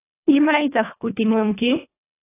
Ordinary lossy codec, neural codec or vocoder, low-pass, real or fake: AAC, 24 kbps; codec, 24 kHz, 1.5 kbps, HILCodec; 3.6 kHz; fake